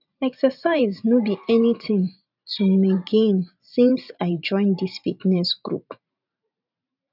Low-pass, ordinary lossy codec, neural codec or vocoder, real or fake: 5.4 kHz; none; vocoder, 44.1 kHz, 128 mel bands every 512 samples, BigVGAN v2; fake